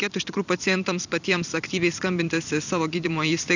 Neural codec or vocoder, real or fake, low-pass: vocoder, 44.1 kHz, 128 mel bands every 256 samples, BigVGAN v2; fake; 7.2 kHz